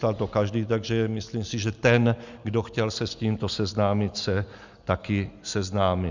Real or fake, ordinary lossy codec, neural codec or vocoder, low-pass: real; Opus, 64 kbps; none; 7.2 kHz